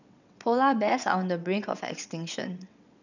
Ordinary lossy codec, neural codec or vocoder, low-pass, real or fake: none; vocoder, 22.05 kHz, 80 mel bands, WaveNeXt; 7.2 kHz; fake